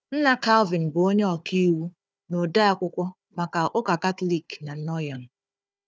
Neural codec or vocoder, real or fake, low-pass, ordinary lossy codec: codec, 16 kHz, 4 kbps, FunCodec, trained on Chinese and English, 50 frames a second; fake; none; none